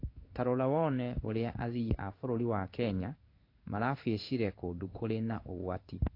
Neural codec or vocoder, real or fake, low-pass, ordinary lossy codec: codec, 16 kHz in and 24 kHz out, 1 kbps, XY-Tokenizer; fake; 5.4 kHz; AAC, 32 kbps